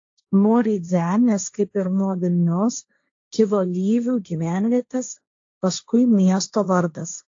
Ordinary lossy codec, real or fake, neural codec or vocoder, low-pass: AAC, 48 kbps; fake; codec, 16 kHz, 1.1 kbps, Voila-Tokenizer; 7.2 kHz